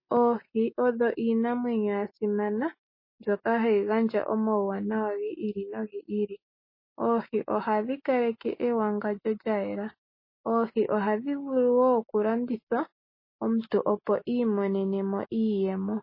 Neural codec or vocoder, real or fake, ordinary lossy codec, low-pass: none; real; MP3, 24 kbps; 5.4 kHz